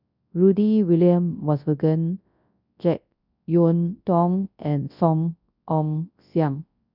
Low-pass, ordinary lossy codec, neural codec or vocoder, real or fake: 5.4 kHz; none; codec, 24 kHz, 0.9 kbps, WavTokenizer, large speech release; fake